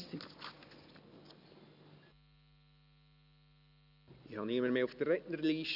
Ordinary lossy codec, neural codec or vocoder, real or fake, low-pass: none; none; real; 5.4 kHz